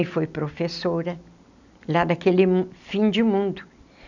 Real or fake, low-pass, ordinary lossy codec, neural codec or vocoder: real; 7.2 kHz; none; none